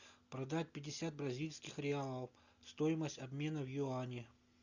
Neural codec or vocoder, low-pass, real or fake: none; 7.2 kHz; real